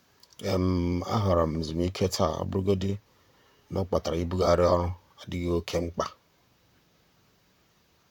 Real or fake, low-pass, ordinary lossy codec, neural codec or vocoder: fake; 19.8 kHz; none; vocoder, 44.1 kHz, 128 mel bands, Pupu-Vocoder